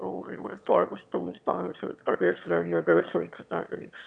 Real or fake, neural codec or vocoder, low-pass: fake; autoencoder, 22.05 kHz, a latent of 192 numbers a frame, VITS, trained on one speaker; 9.9 kHz